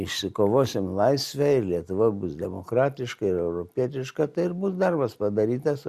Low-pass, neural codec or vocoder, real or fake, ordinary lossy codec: 14.4 kHz; none; real; Opus, 64 kbps